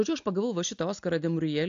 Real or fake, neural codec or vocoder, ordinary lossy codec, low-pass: real; none; AAC, 64 kbps; 7.2 kHz